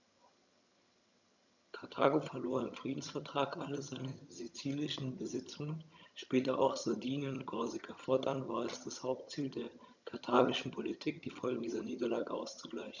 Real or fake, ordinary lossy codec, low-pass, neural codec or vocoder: fake; none; 7.2 kHz; vocoder, 22.05 kHz, 80 mel bands, HiFi-GAN